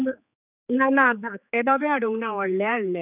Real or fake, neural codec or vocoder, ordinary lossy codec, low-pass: fake; codec, 16 kHz, 4 kbps, X-Codec, HuBERT features, trained on general audio; none; 3.6 kHz